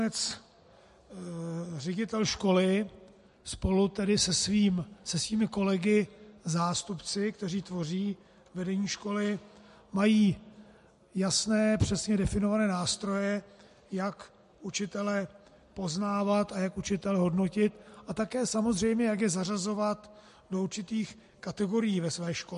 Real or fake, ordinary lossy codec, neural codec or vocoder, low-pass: real; MP3, 48 kbps; none; 14.4 kHz